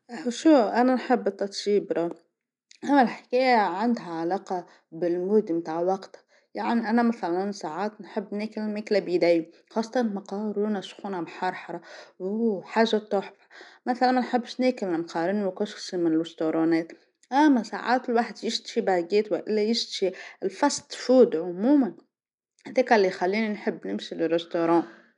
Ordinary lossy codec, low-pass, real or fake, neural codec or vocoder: none; 10.8 kHz; real; none